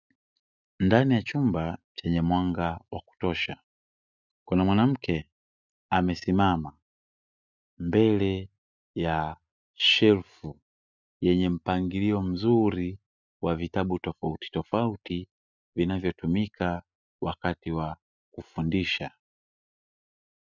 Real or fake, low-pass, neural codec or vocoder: real; 7.2 kHz; none